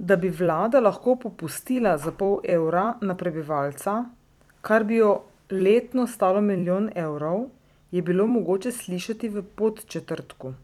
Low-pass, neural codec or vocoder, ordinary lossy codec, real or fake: 19.8 kHz; vocoder, 44.1 kHz, 128 mel bands every 256 samples, BigVGAN v2; none; fake